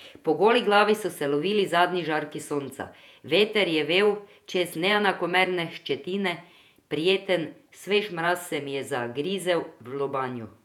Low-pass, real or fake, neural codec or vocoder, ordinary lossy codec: 19.8 kHz; fake; vocoder, 48 kHz, 128 mel bands, Vocos; none